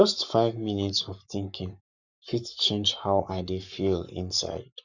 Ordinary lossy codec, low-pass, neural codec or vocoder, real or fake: none; 7.2 kHz; codec, 44.1 kHz, 7.8 kbps, Pupu-Codec; fake